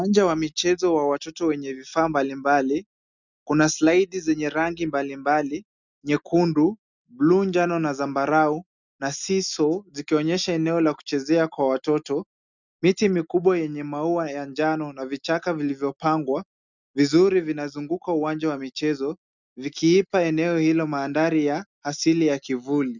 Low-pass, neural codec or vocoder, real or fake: 7.2 kHz; none; real